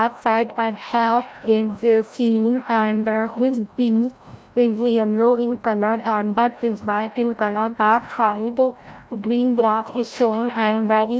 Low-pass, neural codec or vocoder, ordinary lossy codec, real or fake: none; codec, 16 kHz, 0.5 kbps, FreqCodec, larger model; none; fake